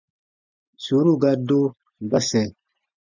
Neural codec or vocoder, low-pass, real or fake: none; 7.2 kHz; real